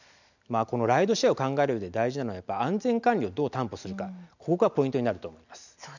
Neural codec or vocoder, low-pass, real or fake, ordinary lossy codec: none; 7.2 kHz; real; none